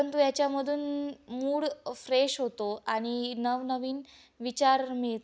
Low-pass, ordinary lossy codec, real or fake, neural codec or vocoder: none; none; real; none